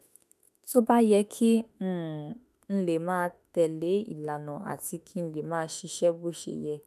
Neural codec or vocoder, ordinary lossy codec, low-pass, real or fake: autoencoder, 48 kHz, 32 numbers a frame, DAC-VAE, trained on Japanese speech; none; 14.4 kHz; fake